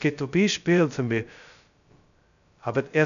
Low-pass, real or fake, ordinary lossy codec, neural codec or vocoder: 7.2 kHz; fake; none; codec, 16 kHz, 0.2 kbps, FocalCodec